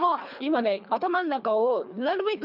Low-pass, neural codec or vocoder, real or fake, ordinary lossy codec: 5.4 kHz; codec, 24 kHz, 3 kbps, HILCodec; fake; none